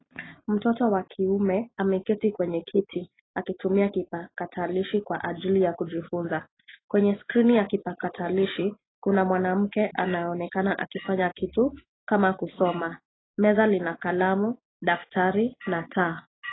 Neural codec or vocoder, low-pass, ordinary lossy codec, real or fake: none; 7.2 kHz; AAC, 16 kbps; real